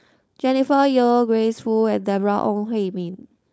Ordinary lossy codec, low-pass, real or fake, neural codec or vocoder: none; none; fake; codec, 16 kHz, 4.8 kbps, FACodec